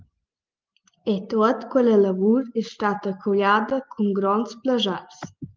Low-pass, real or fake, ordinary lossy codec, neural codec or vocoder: 7.2 kHz; real; Opus, 24 kbps; none